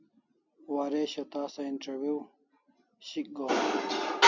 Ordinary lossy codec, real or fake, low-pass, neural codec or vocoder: MP3, 64 kbps; real; 7.2 kHz; none